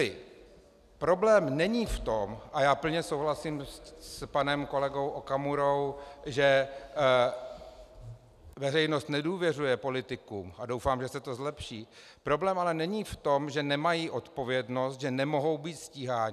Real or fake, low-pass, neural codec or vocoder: real; 14.4 kHz; none